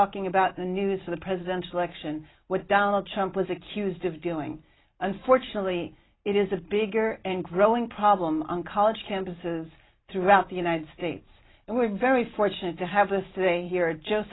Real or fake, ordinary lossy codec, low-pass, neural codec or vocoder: real; AAC, 16 kbps; 7.2 kHz; none